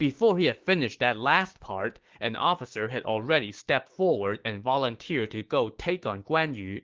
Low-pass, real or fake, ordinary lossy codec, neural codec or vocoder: 7.2 kHz; fake; Opus, 16 kbps; codec, 16 kHz, 6 kbps, DAC